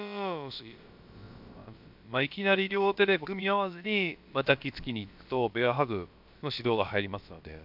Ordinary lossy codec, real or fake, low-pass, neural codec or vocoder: MP3, 48 kbps; fake; 5.4 kHz; codec, 16 kHz, about 1 kbps, DyCAST, with the encoder's durations